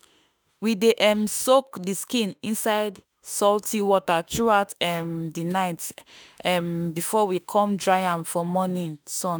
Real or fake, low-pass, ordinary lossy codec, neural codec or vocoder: fake; none; none; autoencoder, 48 kHz, 32 numbers a frame, DAC-VAE, trained on Japanese speech